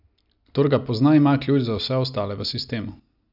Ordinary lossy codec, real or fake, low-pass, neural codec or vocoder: none; real; 5.4 kHz; none